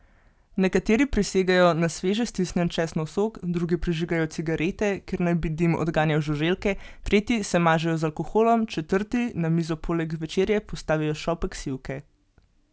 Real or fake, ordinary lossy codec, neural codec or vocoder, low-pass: real; none; none; none